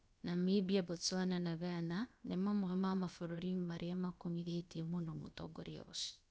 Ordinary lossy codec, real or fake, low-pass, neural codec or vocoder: none; fake; none; codec, 16 kHz, about 1 kbps, DyCAST, with the encoder's durations